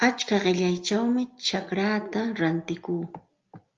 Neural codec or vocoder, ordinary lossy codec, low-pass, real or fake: none; Opus, 32 kbps; 7.2 kHz; real